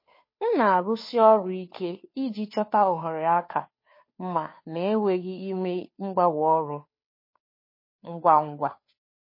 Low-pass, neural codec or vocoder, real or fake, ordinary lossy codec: 5.4 kHz; codec, 16 kHz, 2 kbps, FunCodec, trained on Chinese and English, 25 frames a second; fake; MP3, 24 kbps